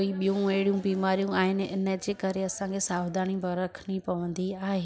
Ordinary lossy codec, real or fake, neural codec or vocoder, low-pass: none; real; none; none